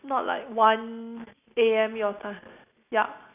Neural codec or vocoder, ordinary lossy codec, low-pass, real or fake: none; none; 3.6 kHz; real